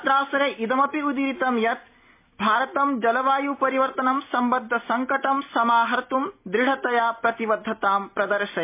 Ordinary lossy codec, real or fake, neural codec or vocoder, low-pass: MP3, 24 kbps; real; none; 3.6 kHz